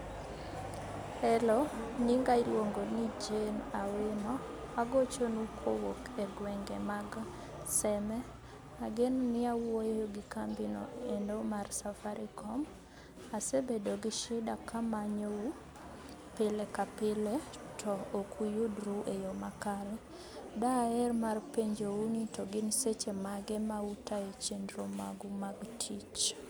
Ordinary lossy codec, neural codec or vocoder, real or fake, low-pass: none; none; real; none